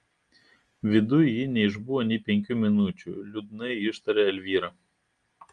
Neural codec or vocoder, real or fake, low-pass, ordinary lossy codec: none; real; 9.9 kHz; Opus, 32 kbps